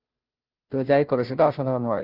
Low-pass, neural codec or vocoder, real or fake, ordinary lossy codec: 5.4 kHz; codec, 16 kHz, 0.5 kbps, FunCodec, trained on Chinese and English, 25 frames a second; fake; Opus, 32 kbps